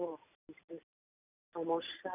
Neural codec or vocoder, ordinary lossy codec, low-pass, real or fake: none; none; 3.6 kHz; real